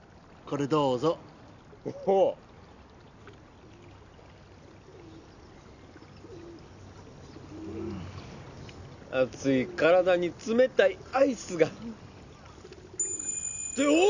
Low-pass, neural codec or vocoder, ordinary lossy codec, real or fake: 7.2 kHz; none; none; real